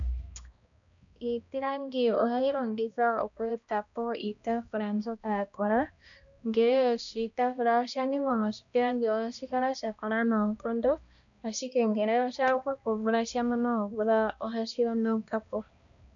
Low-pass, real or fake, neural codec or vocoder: 7.2 kHz; fake; codec, 16 kHz, 1 kbps, X-Codec, HuBERT features, trained on balanced general audio